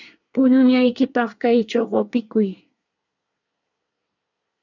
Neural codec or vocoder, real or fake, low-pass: codec, 24 kHz, 1 kbps, SNAC; fake; 7.2 kHz